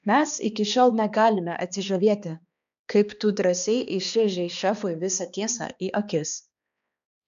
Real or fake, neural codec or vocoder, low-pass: fake; codec, 16 kHz, 2 kbps, X-Codec, HuBERT features, trained on balanced general audio; 7.2 kHz